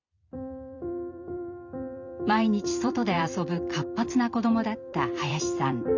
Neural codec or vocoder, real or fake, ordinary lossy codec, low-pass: vocoder, 44.1 kHz, 128 mel bands every 256 samples, BigVGAN v2; fake; Opus, 64 kbps; 7.2 kHz